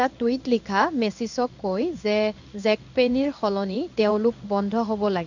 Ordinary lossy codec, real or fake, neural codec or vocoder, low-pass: none; fake; codec, 16 kHz in and 24 kHz out, 1 kbps, XY-Tokenizer; 7.2 kHz